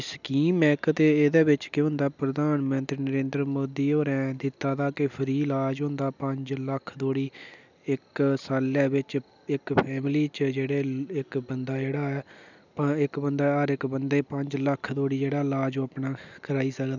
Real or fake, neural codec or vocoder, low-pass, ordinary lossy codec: real; none; 7.2 kHz; none